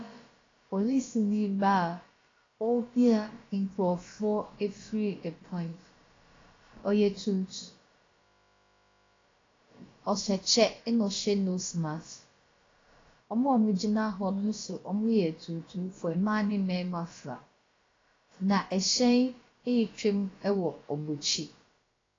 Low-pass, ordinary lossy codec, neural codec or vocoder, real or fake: 7.2 kHz; AAC, 32 kbps; codec, 16 kHz, about 1 kbps, DyCAST, with the encoder's durations; fake